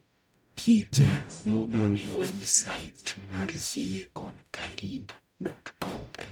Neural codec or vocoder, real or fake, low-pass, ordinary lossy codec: codec, 44.1 kHz, 0.9 kbps, DAC; fake; none; none